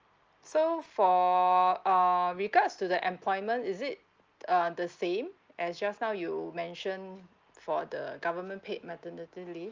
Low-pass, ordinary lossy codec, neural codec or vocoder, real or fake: 7.2 kHz; Opus, 24 kbps; none; real